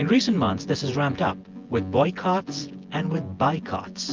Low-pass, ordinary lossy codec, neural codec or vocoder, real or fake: 7.2 kHz; Opus, 32 kbps; vocoder, 24 kHz, 100 mel bands, Vocos; fake